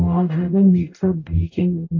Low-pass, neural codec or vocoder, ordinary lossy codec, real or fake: 7.2 kHz; codec, 44.1 kHz, 0.9 kbps, DAC; AAC, 32 kbps; fake